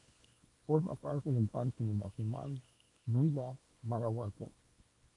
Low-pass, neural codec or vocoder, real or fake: 10.8 kHz; codec, 24 kHz, 0.9 kbps, WavTokenizer, small release; fake